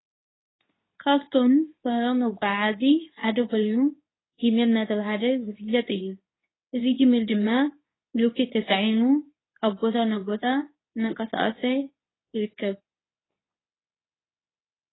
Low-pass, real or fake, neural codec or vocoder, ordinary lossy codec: 7.2 kHz; fake; codec, 24 kHz, 0.9 kbps, WavTokenizer, medium speech release version 2; AAC, 16 kbps